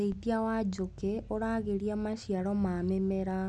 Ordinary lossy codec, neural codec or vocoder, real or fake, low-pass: none; none; real; none